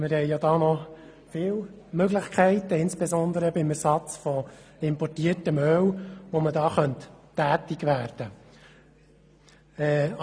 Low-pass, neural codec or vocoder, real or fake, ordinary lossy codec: none; none; real; none